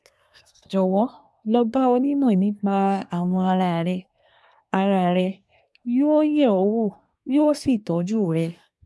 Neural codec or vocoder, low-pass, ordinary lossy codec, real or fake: codec, 24 kHz, 1 kbps, SNAC; none; none; fake